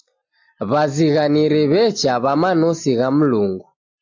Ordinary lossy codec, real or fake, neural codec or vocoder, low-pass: AAC, 48 kbps; real; none; 7.2 kHz